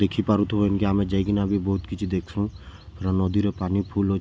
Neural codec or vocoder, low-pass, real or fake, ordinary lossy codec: none; none; real; none